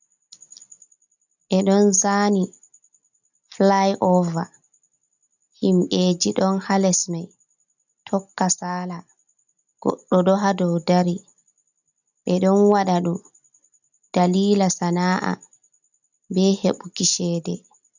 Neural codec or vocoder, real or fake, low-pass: none; real; 7.2 kHz